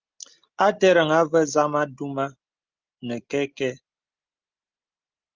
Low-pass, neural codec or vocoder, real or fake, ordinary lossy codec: 7.2 kHz; none; real; Opus, 24 kbps